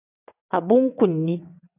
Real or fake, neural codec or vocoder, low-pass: fake; vocoder, 22.05 kHz, 80 mel bands, WaveNeXt; 3.6 kHz